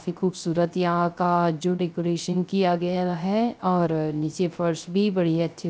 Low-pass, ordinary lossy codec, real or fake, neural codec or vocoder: none; none; fake; codec, 16 kHz, 0.3 kbps, FocalCodec